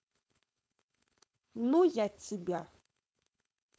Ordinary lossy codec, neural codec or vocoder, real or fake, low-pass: none; codec, 16 kHz, 4.8 kbps, FACodec; fake; none